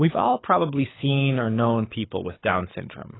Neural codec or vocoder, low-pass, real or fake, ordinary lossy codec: codec, 24 kHz, 6 kbps, HILCodec; 7.2 kHz; fake; AAC, 16 kbps